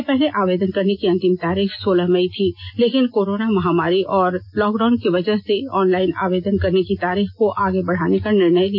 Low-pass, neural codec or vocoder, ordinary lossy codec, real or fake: 5.4 kHz; none; none; real